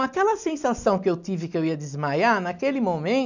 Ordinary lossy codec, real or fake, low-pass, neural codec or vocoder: none; real; 7.2 kHz; none